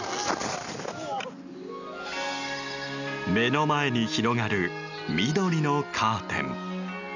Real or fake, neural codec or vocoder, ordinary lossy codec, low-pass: real; none; none; 7.2 kHz